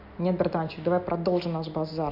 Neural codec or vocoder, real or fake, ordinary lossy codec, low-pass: none; real; MP3, 48 kbps; 5.4 kHz